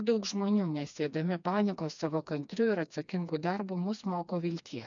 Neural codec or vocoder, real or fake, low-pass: codec, 16 kHz, 2 kbps, FreqCodec, smaller model; fake; 7.2 kHz